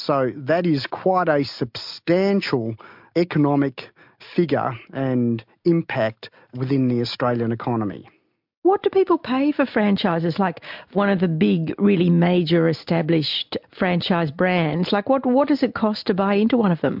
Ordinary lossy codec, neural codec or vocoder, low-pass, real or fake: MP3, 48 kbps; none; 5.4 kHz; real